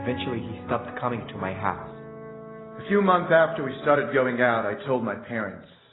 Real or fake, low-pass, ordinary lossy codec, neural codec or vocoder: real; 7.2 kHz; AAC, 16 kbps; none